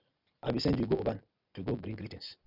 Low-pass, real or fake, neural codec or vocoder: 5.4 kHz; real; none